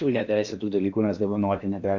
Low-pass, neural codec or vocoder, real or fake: 7.2 kHz; codec, 16 kHz in and 24 kHz out, 0.8 kbps, FocalCodec, streaming, 65536 codes; fake